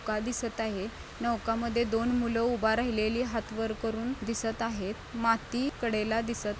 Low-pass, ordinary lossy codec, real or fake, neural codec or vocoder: none; none; real; none